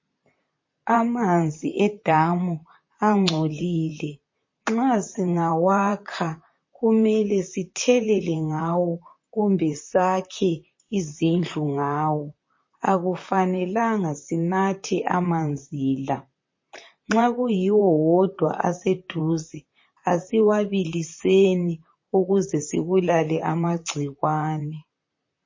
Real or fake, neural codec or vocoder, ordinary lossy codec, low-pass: fake; vocoder, 44.1 kHz, 128 mel bands, Pupu-Vocoder; MP3, 32 kbps; 7.2 kHz